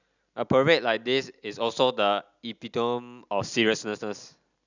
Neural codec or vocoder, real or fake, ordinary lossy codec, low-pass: none; real; none; 7.2 kHz